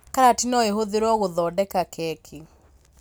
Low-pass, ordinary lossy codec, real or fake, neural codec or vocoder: none; none; real; none